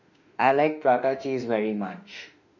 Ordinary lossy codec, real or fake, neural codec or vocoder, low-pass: none; fake; autoencoder, 48 kHz, 32 numbers a frame, DAC-VAE, trained on Japanese speech; 7.2 kHz